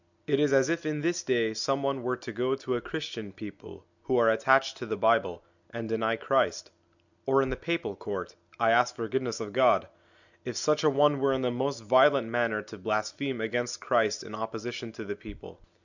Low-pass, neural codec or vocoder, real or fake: 7.2 kHz; none; real